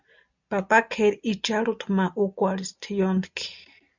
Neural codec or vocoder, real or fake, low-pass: none; real; 7.2 kHz